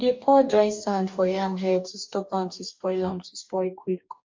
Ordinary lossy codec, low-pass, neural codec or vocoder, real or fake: MP3, 64 kbps; 7.2 kHz; codec, 44.1 kHz, 2.6 kbps, DAC; fake